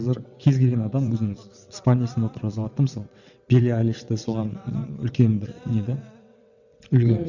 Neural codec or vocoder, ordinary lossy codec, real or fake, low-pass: vocoder, 22.05 kHz, 80 mel bands, WaveNeXt; none; fake; 7.2 kHz